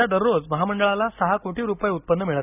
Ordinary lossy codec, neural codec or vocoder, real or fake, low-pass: none; none; real; 3.6 kHz